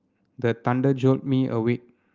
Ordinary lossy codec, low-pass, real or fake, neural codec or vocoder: Opus, 32 kbps; 7.2 kHz; real; none